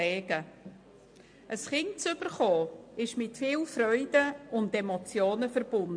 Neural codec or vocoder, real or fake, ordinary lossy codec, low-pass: none; real; MP3, 48 kbps; 9.9 kHz